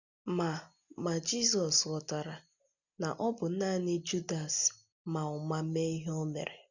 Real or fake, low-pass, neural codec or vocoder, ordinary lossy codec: real; 7.2 kHz; none; none